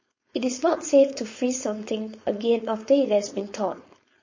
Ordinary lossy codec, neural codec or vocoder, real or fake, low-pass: MP3, 32 kbps; codec, 16 kHz, 4.8 kbps, FACodec; fake; 7.2 kHz